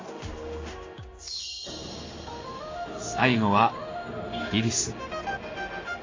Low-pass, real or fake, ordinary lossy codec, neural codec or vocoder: 7.2 kHz; fake; AAC, 32 kbps; codec, 16 kHz in and 24 kHz out, 1 kbps, XY-Tokenizer